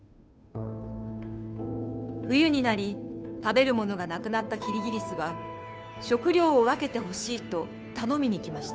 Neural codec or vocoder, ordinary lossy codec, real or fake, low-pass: codec, 16 kHz, 2 kbps, FunCodec, trained on Chinese and English, 25 frames a second; none; fake; none